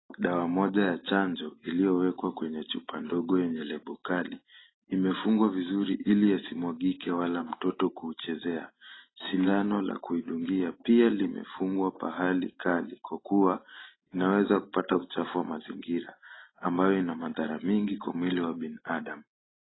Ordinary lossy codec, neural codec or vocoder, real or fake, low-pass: AAC, 16 kbps; none; real; 7.2 kHz